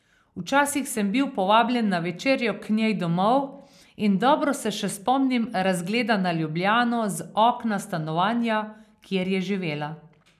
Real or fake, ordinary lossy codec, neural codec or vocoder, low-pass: real; none; none; 14.4 kHz